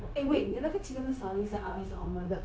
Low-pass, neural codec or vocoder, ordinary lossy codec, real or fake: none; codec, 16 kHz, 0.9 kbps, LongCat-Audio-Codec; none; fake